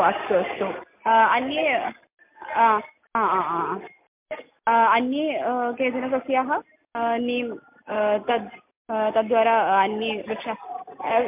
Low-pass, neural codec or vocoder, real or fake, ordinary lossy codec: 3.6 kHz; none; real; MP3, 32 kbps